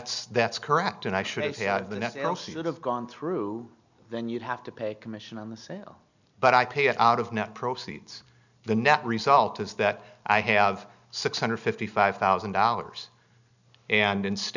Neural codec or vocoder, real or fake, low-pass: none; real; 7.2 kHz